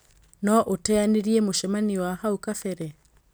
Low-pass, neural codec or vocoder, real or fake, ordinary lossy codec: none; none; real; none